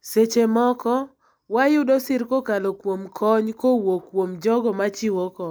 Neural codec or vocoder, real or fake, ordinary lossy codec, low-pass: none; real; none; none